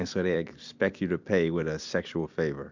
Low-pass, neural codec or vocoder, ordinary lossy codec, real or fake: 7.2 kHz; vocoder, 44.1 kHz, 128 mel bands every 256 samples, BigVGAN v2; MP3, 64 kbps; fake